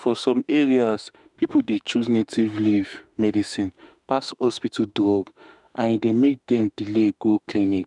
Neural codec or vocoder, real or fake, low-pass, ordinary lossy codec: autoencoder, 48 kHz, 32 numbers a frame, DAC-VAE, trained on Japanese speech; fake; 10.8 kHz; none